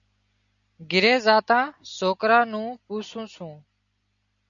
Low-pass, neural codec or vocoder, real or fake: 7.2 kHz; none; real